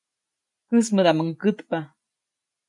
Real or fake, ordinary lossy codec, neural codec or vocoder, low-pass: real; MP3, 96 kbps; none; 10.8 kHz